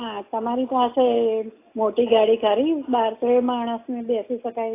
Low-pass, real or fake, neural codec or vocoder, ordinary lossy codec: 3.6 kHz; real; none; AAC, 24 kbps